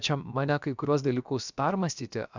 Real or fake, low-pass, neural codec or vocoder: fake; 7.2 kHz; codec, 16 kHz, about 1 kbps, DyCAST, with the encoder's durations